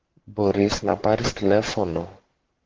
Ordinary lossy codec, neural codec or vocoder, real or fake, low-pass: Opus, 16 kbps; codec, 44.1 kHz, 7.8 kbps, Pupu-Codec; fake; 7.2 kHz